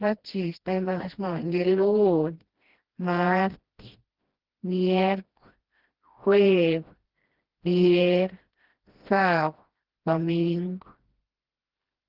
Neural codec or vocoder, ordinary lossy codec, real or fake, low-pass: codec, 16 kHz, 1 kbps, FreqCodec, smaller model; Opus, 16 kbps; fake; 5.4 kHz